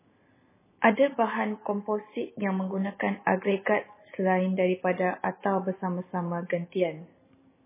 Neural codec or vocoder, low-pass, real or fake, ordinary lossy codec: none; 3.6 kHz; real; MP3, 16 kbps